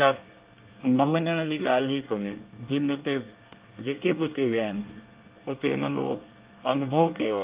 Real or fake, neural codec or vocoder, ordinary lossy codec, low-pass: fake; codec, 24 kHz, 1 kbps, SNAC; Opus, 24 kbps; 3.6 kHz